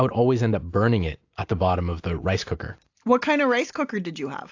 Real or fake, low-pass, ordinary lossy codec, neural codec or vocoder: real; 7.2 kHz; AAC, 48 kbps; none